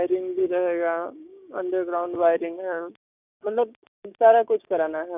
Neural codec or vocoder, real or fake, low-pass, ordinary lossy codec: codec, 16 kHz, 6 kbps, DAC; fake; 3.6 kHz; none